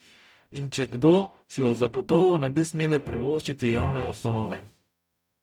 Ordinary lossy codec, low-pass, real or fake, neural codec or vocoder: none; 19.8 kHz; fake; codec, 44.1 kHz, 0.9 kbps, DAC